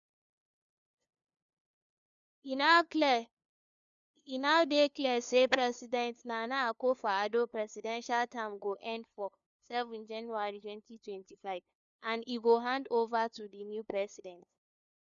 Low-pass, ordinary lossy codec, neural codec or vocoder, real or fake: 7.2 kHz; MP3, 96 kbps; codec, 16 kHz, 2 kbps, FunCodec, trained on LibriTTS, 25 frames a second; fake